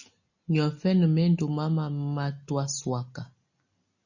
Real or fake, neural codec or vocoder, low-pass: real; none; 7.2 kHz